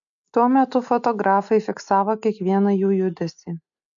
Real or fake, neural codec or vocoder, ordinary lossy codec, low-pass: real; none; MP3, 96 kbps; 7.2 kHz